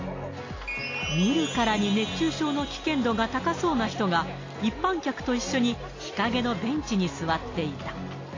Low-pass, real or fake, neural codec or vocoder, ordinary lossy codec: 7.2 kHz; real; none; AAC, 32 kbps